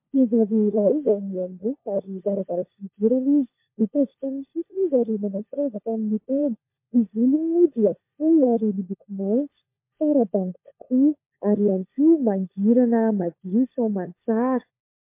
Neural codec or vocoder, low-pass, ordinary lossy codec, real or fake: codec, 16 kHz, 16 kbps, FunCodec, trained on LibriTTS, 50 frames a second; 3.6 kHz; MP3, 24 kbps; fake